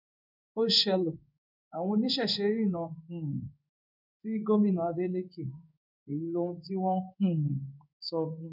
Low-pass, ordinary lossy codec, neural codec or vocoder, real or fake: 5.4 kHz; none; codec, 16 kHz in and 24 kHz out, 1 kbps, XY-Tokenizer; fake